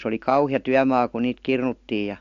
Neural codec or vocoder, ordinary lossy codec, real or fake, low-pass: none; AAC, 64 kbps; real; 7.2 kHz